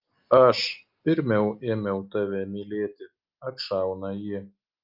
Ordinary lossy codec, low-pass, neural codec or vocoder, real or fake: Opus, 32 kbps; 5.4 kHz; none; real